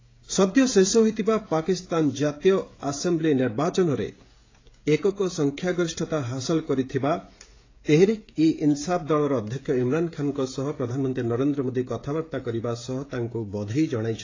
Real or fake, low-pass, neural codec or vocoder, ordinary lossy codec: fake; 7.2 kHz; codec, 16 kHz, 16 kbps, FreqCodec, smaller model; AAC, 32 kbps